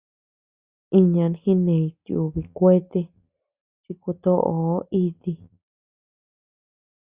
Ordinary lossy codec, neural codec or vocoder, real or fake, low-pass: Opus, 64 kbps; none; real; 3.6 kHz